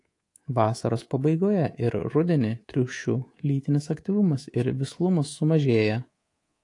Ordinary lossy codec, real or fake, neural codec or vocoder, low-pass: AAC, 48 kbps; fake; codec, 24 kHz, 3.1 kbps, DualCodec; 10.8 kHz